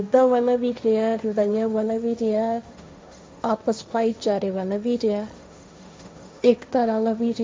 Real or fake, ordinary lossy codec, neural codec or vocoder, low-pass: fake; none; codec, 16 kHz, 1.1 kbps, Voila-Tokenizer; none